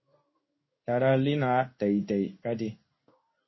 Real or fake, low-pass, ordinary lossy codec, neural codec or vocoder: fake; 7.2 kHz; MP3, 24 kbps; codec, 16 kHz in and 24 kHz out, 1 kbps, XY-Tokenizer